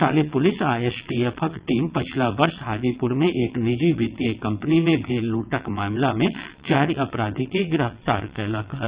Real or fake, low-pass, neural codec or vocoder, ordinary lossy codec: fake; 3.6 kHz; vocoder, 22.05 kHz, 80 mel bands, WaveNeXt; Opus, 64 kbps